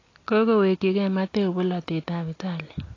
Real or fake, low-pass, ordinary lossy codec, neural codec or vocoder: real; 7.2 kHz; AAC, 32 kbps; none